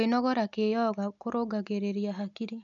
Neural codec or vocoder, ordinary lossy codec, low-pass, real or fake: none; none; 7.2 kHz; real